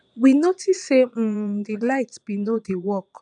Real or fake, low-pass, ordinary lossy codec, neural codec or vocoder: fake; 9.9 kHz; none; vocoder, 22.05 kHz, 80 mel bands, Vocos